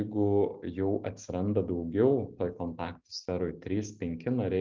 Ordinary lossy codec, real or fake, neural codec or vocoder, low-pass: Opus, 24 kbps; real; none; 7.2 kHz